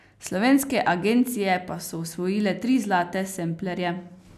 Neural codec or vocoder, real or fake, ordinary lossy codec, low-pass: none; real; none; 14.4 kHz